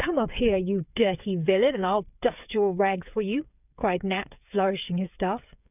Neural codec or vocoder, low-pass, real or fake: codec, 16 kHz, 8 kbps, FreqCodec, smaller model; 3.6 kHz; fake